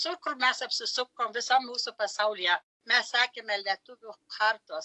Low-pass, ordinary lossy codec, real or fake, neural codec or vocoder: 10.8 kHz; AAC, 64 kbps; real; none